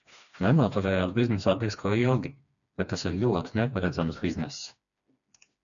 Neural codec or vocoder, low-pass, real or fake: codec, 16 kHz, 2 kbps, FreqCodec, smaller model; 7.2 kHz; fake